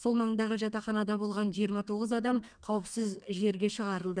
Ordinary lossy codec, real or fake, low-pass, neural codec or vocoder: none; fake; 9.9 kHz; codec, 32 kHz, 1.9 kbps, SNAC